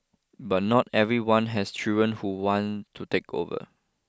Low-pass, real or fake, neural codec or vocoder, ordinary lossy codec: none; real; none; none